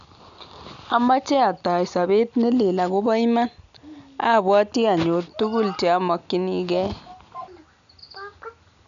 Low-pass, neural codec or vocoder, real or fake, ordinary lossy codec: 7.2 kHz; none; real; none